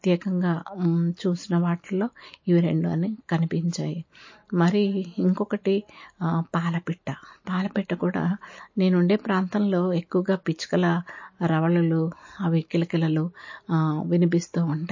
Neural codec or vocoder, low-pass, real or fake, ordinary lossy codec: none; 7.2 kHz; real; MP3, 32 kbps